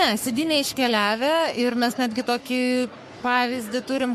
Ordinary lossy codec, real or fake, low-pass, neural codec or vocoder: MP3, 64 kbps; fake; 14.4 kHz; codec, 44.1 kHz, 3.4 kbps, Pupu-Codec